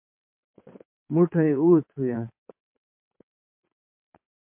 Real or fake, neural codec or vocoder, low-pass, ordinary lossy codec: fake; vocoder, 22.05 kHz, 80 mel bands, WaveNeXt; 3.6 kHz; MP3, 32 kbps